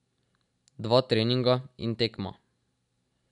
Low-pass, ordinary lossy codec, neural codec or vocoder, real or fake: 10.8 kHz; none; none; real